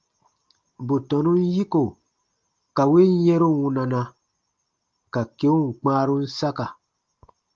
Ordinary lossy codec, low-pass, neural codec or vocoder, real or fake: Opus, 24 kbps; 7.2 kHz; none; real